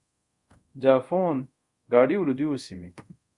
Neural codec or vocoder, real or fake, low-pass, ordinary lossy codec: codec, 24 kHz, 0.5 kbps, DualCodec; fake; 10.8 kHz; Opus, 64 kbps